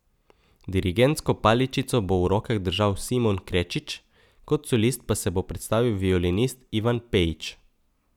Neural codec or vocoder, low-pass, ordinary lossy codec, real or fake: none; 19.8 kHz; none; real